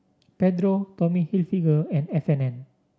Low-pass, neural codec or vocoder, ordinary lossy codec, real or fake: none; none; none; real